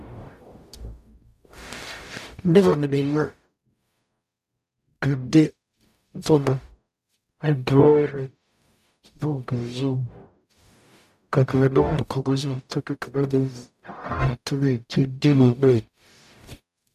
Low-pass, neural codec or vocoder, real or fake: 14.4 kHz; codec, 44.1 kHz, 0.9 kbps, DAC; fake